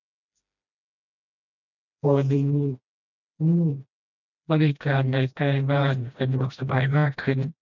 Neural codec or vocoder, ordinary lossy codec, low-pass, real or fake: codec, 16 kHz, 1 kbps, FreqCodec, smaller model; none; 7.2 kHz; fake